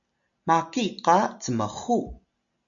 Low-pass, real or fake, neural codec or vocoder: 7.2 kHz; real; none